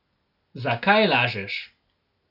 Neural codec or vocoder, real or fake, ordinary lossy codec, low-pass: none; real; none; 5.4 kHz